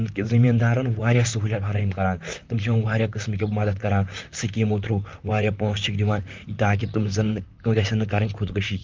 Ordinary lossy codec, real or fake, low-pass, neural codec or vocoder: Opus, 24 kbps; fake; 7.2 kHz; vocoder, 44.1 kHz, 128 mel bands, Pupu-Vocoder